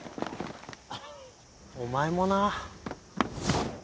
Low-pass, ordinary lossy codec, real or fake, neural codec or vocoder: none; none; real; none